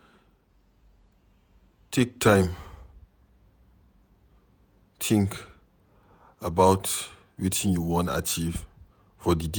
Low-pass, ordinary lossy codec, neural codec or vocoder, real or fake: none; none; vocoder, 48 kHz, 128 mel bands, Vocos; fake